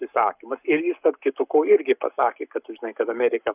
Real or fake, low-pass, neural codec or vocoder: fake; 3.6 kHz; codec, 44.1 kHz, 7.8 kbps, Pupu-Codec